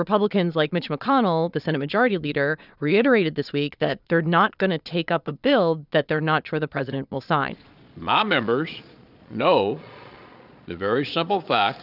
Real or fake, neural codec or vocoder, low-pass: fake; vocoder, 22.05 kHz, 80 mel bands, Vocos; 5.4 kHz